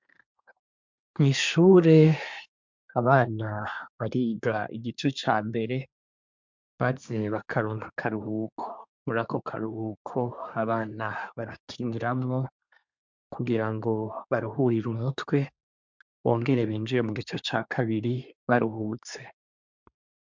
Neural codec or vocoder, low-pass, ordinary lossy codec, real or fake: codec, 24 kHz, 1 kbps, SNAC; 7.2 kHz; MP3, 64 kbps; fake